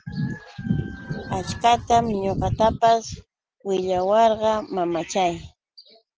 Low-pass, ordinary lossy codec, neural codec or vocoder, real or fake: 7.2 kHz; Opus, 16 kbps; none; real